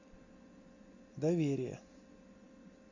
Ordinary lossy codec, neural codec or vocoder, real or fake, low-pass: AAC, 48 kbps; none; real; 7.2 kHz